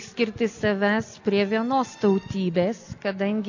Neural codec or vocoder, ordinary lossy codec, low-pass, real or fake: none; AAC, 48 kbps; 7.2 kHz; real